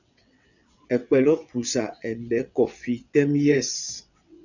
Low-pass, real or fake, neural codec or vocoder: 7.2 kHz; fake; vocoder, 22.05 kHz, 80 mel bands, WaveNeXt